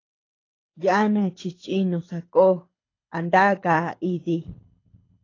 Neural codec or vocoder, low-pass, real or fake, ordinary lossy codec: codec, 24 kHz, 6 kbps, HILCodec; 7.2 kHz; fake; AAC, 32 kbps